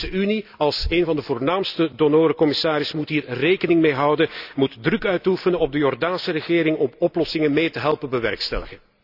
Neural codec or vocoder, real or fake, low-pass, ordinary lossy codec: none; real; 5.4 kHz; none